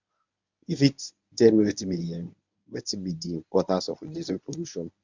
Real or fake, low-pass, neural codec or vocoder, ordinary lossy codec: fake; 7.2 kHz; codec, 24 kHz, 0.9 kbps, WavTokenizer, medium speech release version 1; MP3, 64 kbps